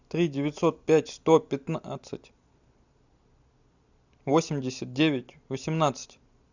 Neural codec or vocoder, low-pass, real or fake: none; 7.2 kHz; real